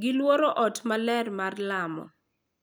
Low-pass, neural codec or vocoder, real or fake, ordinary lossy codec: none; none; real; none